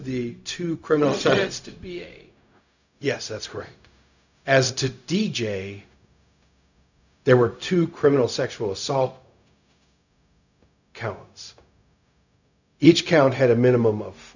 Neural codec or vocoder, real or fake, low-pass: codec, 16 kHz, 0.4 kbps, LongCat-Audio-Codec; fake; 7.2 kHz